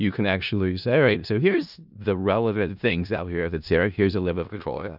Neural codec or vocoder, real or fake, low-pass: codec, 16 kHz in and 24 kHz out, 0.4 kbps, LongCat-Audio-Codec, four codebook decoder; fake; 5.4 kHz